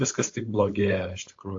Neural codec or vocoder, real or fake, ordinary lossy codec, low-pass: codec, 16 kHz, 4.8 kbps, FACodec; fake; AAC, 48 kbps; 7.2 kHz